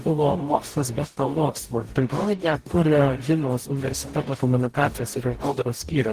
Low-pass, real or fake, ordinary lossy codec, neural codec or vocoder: 14.4 kHz; fake; Opus, 16 kbps; codec, 44.1 kHz, 0.9 kbps, DAC